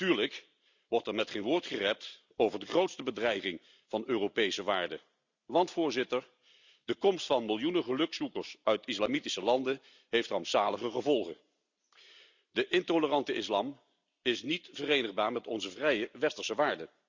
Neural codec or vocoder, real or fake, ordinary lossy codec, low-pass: none; real; Opus, 64 kbps; 7.2 kHz